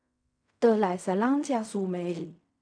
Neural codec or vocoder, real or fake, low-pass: codec, 16 kHz in and 24 kHz out, 0.4 kbps, LongCat-Audio-Codec, fine tuned four codebook decoder; fake; 9.9 kHz